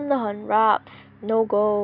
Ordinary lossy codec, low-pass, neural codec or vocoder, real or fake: none; 5.4 kHz; none; real